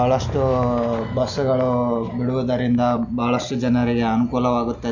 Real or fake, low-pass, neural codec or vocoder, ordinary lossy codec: real; 7.2 kHz; none; none